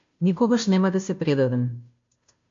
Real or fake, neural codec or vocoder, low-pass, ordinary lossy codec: fake; codec, 16 kHz, 0.5 kbps, FunCodec, trained on Chinese and English, 25 frames a second; 7.2 kHz; MP3, 48 kbps